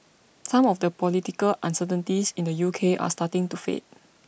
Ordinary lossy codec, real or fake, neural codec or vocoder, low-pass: none; real; none; none